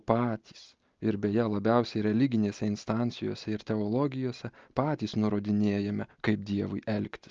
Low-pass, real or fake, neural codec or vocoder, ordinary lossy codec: 7.2 kHz; real; none; Opus, 24 kbps